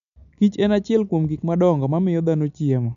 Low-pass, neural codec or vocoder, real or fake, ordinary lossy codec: 7.2 kHz; none; real; none